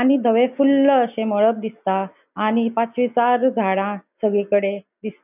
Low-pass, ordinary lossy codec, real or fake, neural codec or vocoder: 3.6 kHz; none; real; none